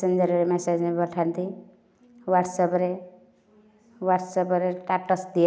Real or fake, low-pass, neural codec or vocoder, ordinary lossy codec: real; none; none; none